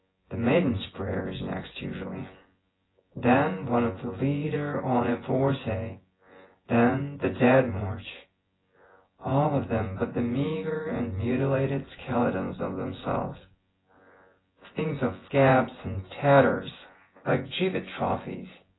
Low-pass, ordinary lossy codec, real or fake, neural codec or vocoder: 7.2 kHz; AAC, 16 kbps; fake; vocoder, 24 kHz, 100 mel bands, Vocos